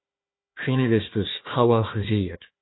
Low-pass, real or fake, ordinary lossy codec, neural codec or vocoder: 7.2 kHz; fake; AAC, 16 kbps; codec, 16 kHz, 1 kbps, FunCodec, trained on Chinese and English, 50 frames a second